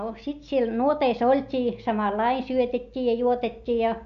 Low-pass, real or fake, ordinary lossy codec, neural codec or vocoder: 7.2 kHz; real; AAC, 64 kbps; none